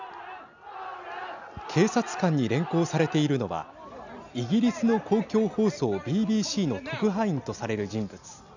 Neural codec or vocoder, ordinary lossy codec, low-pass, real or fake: none; none; 7.2 kHz; real